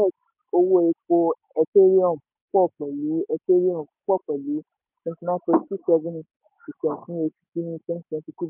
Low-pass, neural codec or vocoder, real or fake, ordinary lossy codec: 3.6 kHz; none; real; none